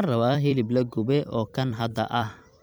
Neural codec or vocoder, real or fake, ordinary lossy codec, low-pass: vocoder, 44.1 kHz, 128 mel bands every 256 samples, BigVGAN v2; fake; none; none